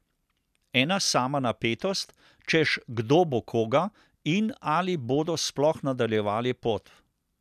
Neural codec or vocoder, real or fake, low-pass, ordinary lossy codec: none; real; 14.4 kHz; none